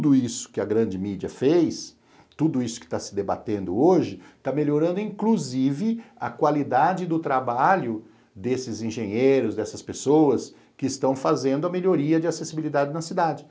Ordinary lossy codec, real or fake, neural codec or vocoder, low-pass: none; real; none; none